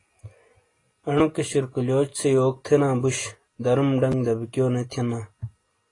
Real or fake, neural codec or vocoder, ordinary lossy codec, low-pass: real; none; AAC, 32 kbps; 10.8 kHz